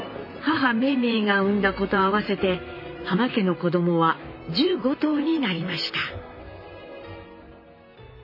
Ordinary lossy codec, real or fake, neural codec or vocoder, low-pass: MP3, 24 kbps; fake; vocoder, 44.1 kHz, 128 mel bands, Pupu-Vocoder; 5.4 kHz